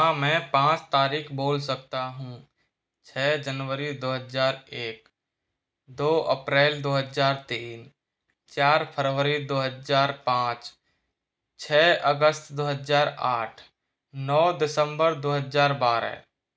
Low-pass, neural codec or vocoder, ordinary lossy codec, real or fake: none; none; none; real